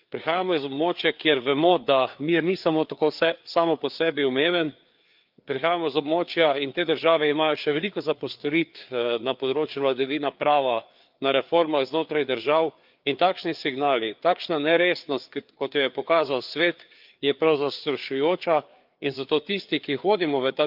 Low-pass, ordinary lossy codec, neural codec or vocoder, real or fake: 5.4 kHz; Opus, 24 kbps; codec, 16 kHz, 4 kbps, FreqCodec, larger model; fake